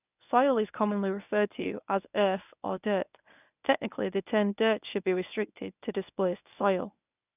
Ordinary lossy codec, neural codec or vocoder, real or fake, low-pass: none; codec, 24 kHz, 0.9 kbps, WavTokenizer, medium speech release version 1; fake; 3.6 kHz